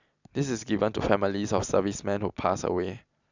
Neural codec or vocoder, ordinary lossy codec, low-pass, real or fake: none; none; 7.2 kHz; real